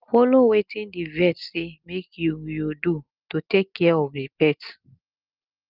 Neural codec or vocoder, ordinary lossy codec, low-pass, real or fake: none; Opus, 32 kbps; 5.4 kHz; real